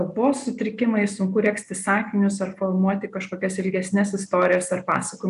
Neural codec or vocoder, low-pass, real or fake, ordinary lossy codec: none; 10.8 kHz; real; AAC, 96 kbps